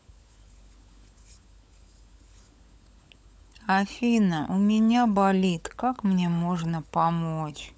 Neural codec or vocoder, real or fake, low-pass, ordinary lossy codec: codec, 16 kHz, 16 kbps, FunCodec, trained on LibriTTS, 50 frames a second; fake; none; none